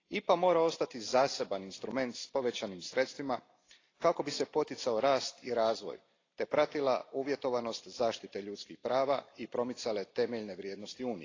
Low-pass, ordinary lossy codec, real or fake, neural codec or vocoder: 7.2 kHz; AAC, 32 kbps; real; none